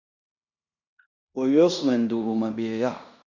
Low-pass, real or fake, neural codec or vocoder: 7.2 kHz; fake; codec, 16 kHz in and 24 kHz out, 0.9 kbps, LongCat-Audio-Codec, fine tuned four codebook decoder